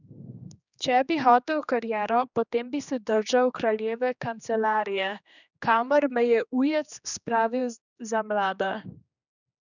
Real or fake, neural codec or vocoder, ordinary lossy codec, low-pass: fake; codec, 16 kHz, 2 kbps, X-Codec, HuBERT features, trained on general audio; none; 7.2 kHz